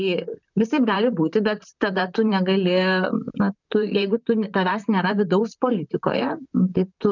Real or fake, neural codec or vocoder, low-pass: fake; vocoder, 44.1 kHz, 128 mel bands, Pupu-Vocoder; 7.2 kHz